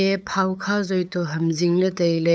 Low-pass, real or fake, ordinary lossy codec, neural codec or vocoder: none; fake; none; codec, 16 kHz, 8 kbps, FunCodec, trained on LibriTTS, 25 frames a second